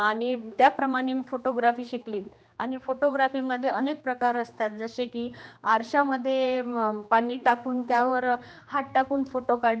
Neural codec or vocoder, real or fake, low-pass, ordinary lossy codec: codec, 16 kHz, 2 kbps, X-Codec, HuBERT features, trained on general audio; fake; none; none